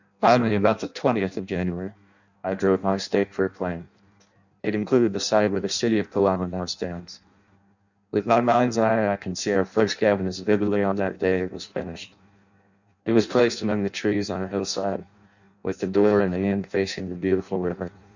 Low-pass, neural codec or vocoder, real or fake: 7.2 kHz; codec, 16 kHz in and 24 kHz out, 0.6 kbps, FireRedTTS-2 codec; fake